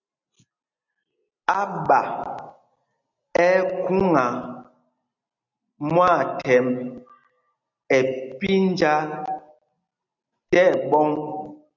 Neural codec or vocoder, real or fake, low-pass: none; real; 7.2 kHz